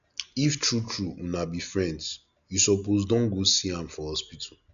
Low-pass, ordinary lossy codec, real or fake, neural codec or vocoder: 7.2 kHz; none; real; none